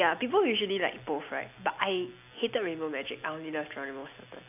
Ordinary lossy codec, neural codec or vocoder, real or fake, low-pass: AAC, 32 kbps; none; real; 3.6 kHz